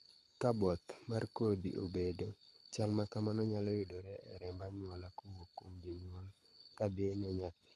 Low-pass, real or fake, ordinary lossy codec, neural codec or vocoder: none; fake; none; codec, 24 kHz, 6 kbps, HILCodec